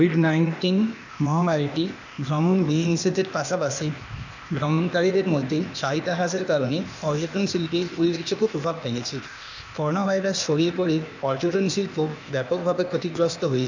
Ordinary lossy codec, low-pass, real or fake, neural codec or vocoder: none; 7.2 kHz; fake; codec, 16 kHz, 0.8 kbps, ZipCodec